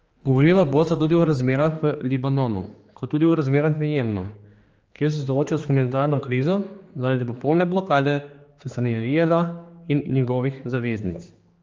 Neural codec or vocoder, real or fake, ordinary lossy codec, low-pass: codec, 16 kHz, 2 kbps, X-Codec, HuBERT features, trained on general audio; fake; Opus, 24 kbps; 7.2 kHz